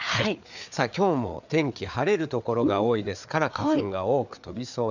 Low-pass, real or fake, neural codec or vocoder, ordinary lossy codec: 7.2 kHz; fake; codec, 16 kHz, 4 kbps, FreqCodec, larger model; none